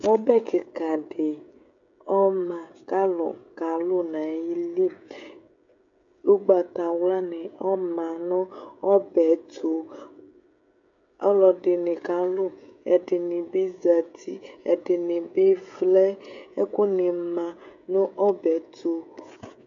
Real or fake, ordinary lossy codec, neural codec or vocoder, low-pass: fake; AAC, 64 kbps; codec, 16 kHz, 16 kbps, FreqCodec, smaller model; 7.2 kHz